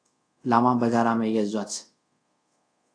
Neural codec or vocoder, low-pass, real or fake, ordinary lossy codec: codec, 24 kHz, 0.5 kbps, DualCodec; 9.9 kHz; fake; AAC, 48 kbps